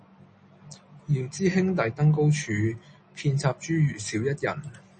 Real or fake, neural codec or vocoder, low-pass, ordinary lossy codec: real; none; 10.8 kHz; MP3, 32 kbps